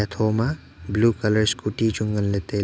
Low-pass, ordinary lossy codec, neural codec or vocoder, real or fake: none; none; none; real